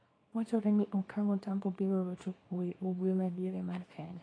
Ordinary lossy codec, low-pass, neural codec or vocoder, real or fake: AAC, 32 kbps; 9.9 kHz; codec, 24 kHz, 0.9 kbps, WavTokenizer, small release; fake